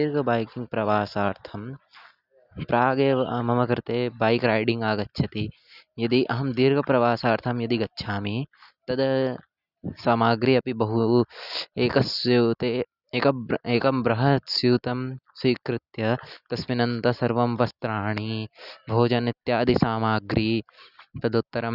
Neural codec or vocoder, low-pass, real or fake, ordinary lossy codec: none; 5.4 kHz; real; none